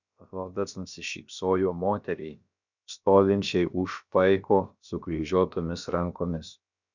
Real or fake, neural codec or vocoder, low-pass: fake; codec, 16 kHz, about 1 kbps, DyCAST, with the encoder's durations; 7.2 kHz